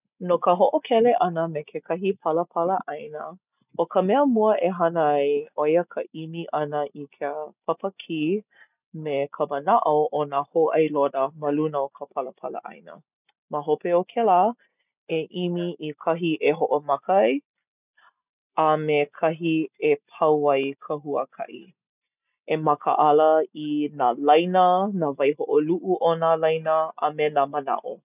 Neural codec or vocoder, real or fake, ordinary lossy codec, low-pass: none; real; none; 3.6 kHz